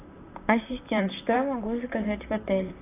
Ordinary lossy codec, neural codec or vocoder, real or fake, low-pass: AAC, 24 kbps; vocoder, 44.1 kHz, 128 mel bands, Pupu-Vocoder; fake; 3.6 kHz